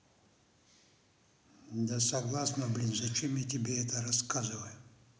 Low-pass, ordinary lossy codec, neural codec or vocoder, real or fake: none; none; none; real